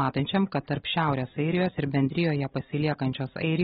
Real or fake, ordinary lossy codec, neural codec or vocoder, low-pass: real; AAC, 16 kbps; none; 14.4 kHz